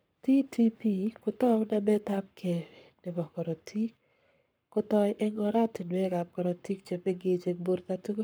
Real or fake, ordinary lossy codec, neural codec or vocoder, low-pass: fake; none; codec, 44.1 kHz, 7.8 kbps, Pupu-Codec; none